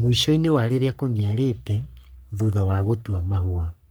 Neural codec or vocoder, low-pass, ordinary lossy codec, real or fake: codec, 44.1 kHz, 3.4 kbps, Pupu-Codec; none; none; fake